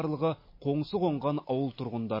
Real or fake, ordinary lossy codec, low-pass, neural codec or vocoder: real; MP3, 24 kbps; 5.4 kHz; none